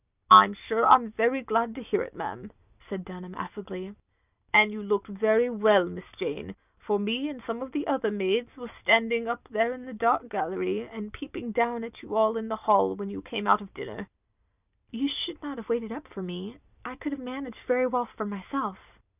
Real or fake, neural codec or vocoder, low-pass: real; none; 3.6 kHz